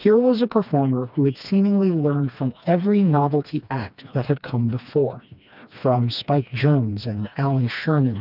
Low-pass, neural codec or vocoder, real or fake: 5.4 kHz; codec, 16 kHz, 2 kbps, FreqCodec, smaller model; fake